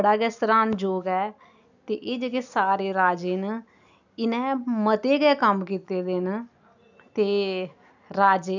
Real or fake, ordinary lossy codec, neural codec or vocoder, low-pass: real; none; none; 7.2 kHz